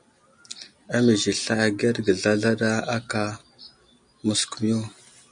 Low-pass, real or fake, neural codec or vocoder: 9.9 kHz; real; none